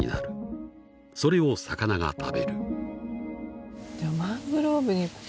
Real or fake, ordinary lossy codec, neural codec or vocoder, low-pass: real; none; none; none